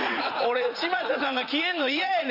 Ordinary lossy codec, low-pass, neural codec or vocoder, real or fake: none; 5.4 kHz; vocoder, 44.1 kHz, 80 mel bands, Vocos; fake